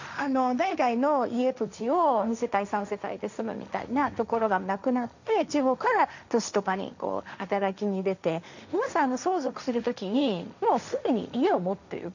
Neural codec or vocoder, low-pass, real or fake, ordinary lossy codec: codec, 16 kHz, 1.1 kbps, Voila-Tokenizer; 7.2 kHz; fake; none